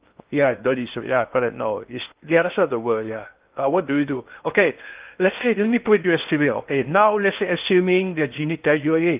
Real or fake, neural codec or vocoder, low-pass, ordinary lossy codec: fake; codec, 16 kHz in and 24 kHz out, 0.6 kbps, FocalCodec, streaming, 4096 codes; 3.6 kHz; Opus, 32 kbps